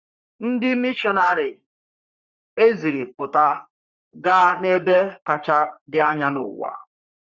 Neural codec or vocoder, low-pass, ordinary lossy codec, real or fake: codec, 44.1 kHz, 3.4 kbps, Pupu-Codec; 7.2 kHz; Opus, 64 kbps; fake